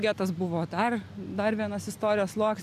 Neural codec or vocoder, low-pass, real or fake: none; 14.4 kHz; real